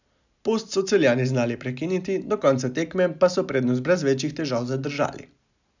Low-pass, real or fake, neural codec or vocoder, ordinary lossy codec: 7.2 kHz; real; none; none